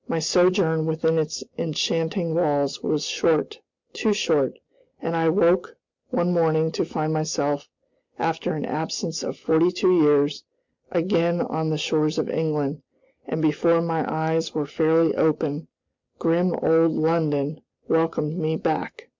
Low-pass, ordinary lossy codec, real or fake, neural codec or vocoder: 7.2 kHz; MP3, 64 kbps; real; none